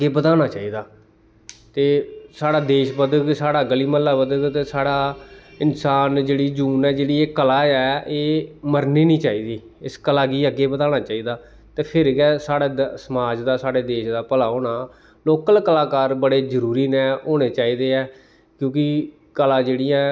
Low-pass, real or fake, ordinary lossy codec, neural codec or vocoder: none; real; none; none